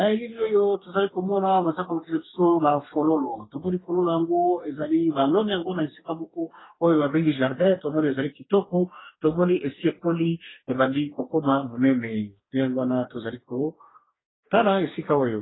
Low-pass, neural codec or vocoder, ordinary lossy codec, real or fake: 7.2 kHz; codec, 44.1 kHz, 2.6 kbps, DAC; AAC, 16 kbps; fake